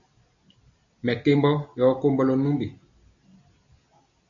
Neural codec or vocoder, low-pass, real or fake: none; 7.2 kHz; real